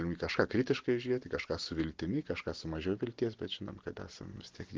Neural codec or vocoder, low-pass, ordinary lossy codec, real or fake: none; 7.2 kHz; Opus, 16 kbps; real